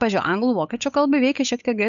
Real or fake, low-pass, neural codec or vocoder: fake; 7.2 kHz; codec, 16 kHz, 8 kbps, FunCodec, trained on Chinese and English, 25 frames a second